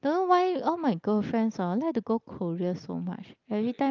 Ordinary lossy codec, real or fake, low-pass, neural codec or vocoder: Opus, 32 kbps; real; 7.2 kHz; none